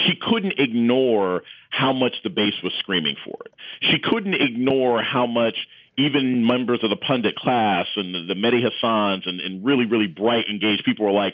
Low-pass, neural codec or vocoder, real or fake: 7.2 kHz; none; real